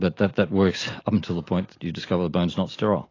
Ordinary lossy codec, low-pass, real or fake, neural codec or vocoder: AAC, 32 kbps; 7.2 kHz; real; none